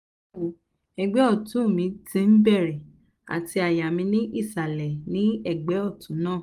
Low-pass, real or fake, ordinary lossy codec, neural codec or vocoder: 14.4 kHz; real; Opus, 32 kbps; none